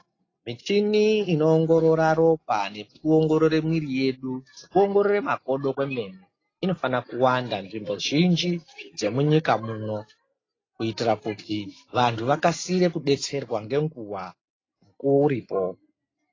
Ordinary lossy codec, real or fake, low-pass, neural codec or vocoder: AAC, 32 kbps; real; 7.2 kHz; none